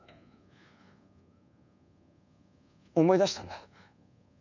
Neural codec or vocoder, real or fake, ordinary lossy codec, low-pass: codec, 24 kHz, 1.2 kbps, DualCodec; fake; none; 7.2 kHz